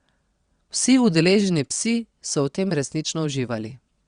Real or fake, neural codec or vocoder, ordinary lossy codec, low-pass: fake; vocoder, 22.05 kHz, 80 mel bands, WaveNeXt; Opus, 64 kbps; 9.9 kHz